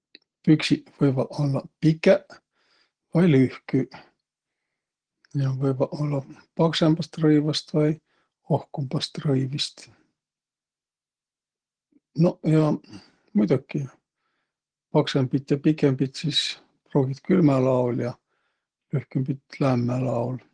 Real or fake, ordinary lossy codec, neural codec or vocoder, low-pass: real; Opus, 16 kbps; none; 9.9 kHz